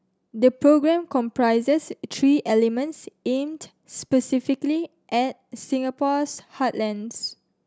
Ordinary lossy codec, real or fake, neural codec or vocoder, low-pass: none; real; none; none